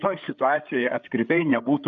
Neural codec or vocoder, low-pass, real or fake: codec, 16 kHz, 8 kbps, FreqCodec, larger model; 7.2 kHz; fake